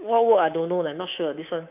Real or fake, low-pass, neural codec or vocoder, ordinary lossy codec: fake; 3.6 kHz; codec, 24 kHz, 3.1 kbps, DualCodec; MP3, 32 kbps